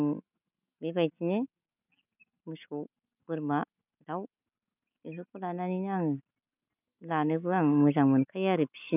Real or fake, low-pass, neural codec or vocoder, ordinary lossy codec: real; 3.6 kHz; none; none